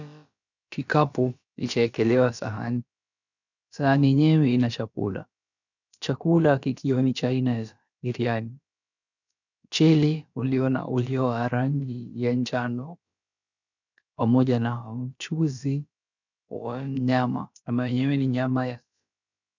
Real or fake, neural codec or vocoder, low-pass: fake; codec, 16 kHz, about 1 kbps, DyCAST, with the encoder's durations; 7.2 kHz